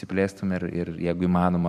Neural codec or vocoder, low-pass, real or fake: vocoder, 44.1 kHz, 128 mel bands every 512 samples, BigVGAN v2; 14.4 kHz; fake